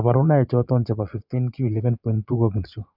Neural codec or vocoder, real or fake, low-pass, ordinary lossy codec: vocoder, 22.05 kHz, 80 mel bands, Vocos; fake; 5.4 kHz; none